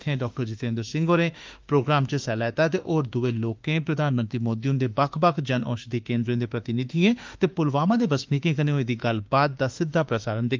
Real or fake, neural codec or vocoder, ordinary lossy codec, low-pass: fake; autoencoder, 48 kHz, 32 numbers a frame, DAC-VAE, trained on Japanese speech; Opus, 24 kbps; 7.2 kHz